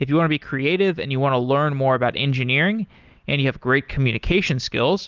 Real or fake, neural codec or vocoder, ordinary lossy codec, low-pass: real; none; Opus, 24 kbps; 7.2 kHz